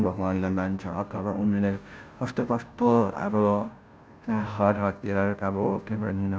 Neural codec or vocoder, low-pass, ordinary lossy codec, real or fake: codec, 16 kHz, 0.5 kbps, FunCodec, trained on Chinese and English, 25 frames a second; none; none; fake